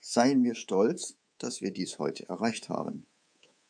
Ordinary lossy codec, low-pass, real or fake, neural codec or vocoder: AAC, 64 kbps; 9.9 kHz; fake; codec, 24 kHz, 3.1 kbps, DualCodec